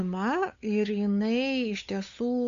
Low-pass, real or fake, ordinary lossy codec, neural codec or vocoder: 7.2 kHz; fake; AAC, 64 kbps; codec, 16 kHz, 8 kbps, FunCodec, trained on Chinese and English, 25 frames a second